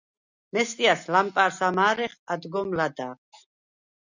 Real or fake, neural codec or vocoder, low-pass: real; none; 7.2 kHz